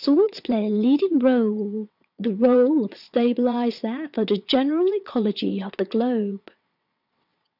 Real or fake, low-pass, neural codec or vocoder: fake; 5.4 kHz; vocoder, 22.05 kHz, 80 mel bands, WaveNeXt